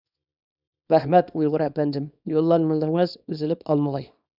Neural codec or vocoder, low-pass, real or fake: codec, 24 kHz, 0.9 kbps, WavTokenizer, small release; 5.4 kHz; fake